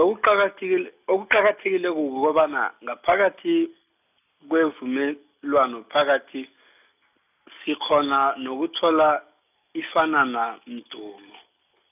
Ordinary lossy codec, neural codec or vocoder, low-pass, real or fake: none; none; 3.6 kHz; real